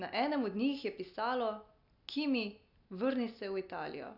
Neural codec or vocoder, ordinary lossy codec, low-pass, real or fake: none; none; 5.4 kHz; real